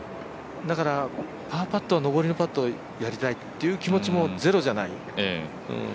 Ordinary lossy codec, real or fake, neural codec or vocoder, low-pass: none; real; none; none